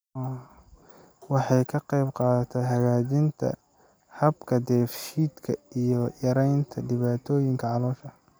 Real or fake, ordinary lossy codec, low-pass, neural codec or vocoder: real; none; none; none